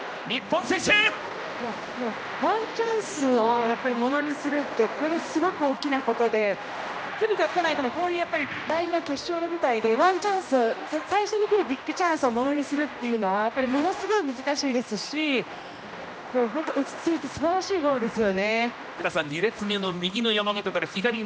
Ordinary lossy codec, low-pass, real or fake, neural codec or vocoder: none; none; fake; codec, 16 kHz, 1 kbps, X-Codec, HuBERT features, trained on general audio